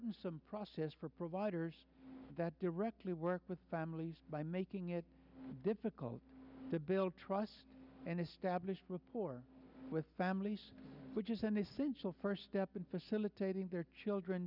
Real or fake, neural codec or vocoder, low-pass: real; none; 5.4 kHz